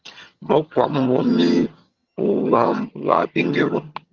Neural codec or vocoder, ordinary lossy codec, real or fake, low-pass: vocoder, 22.05 kHz, 80 mel bands, HiFi-GAN; Opus, 32 kbps; fake; 7.2 kHz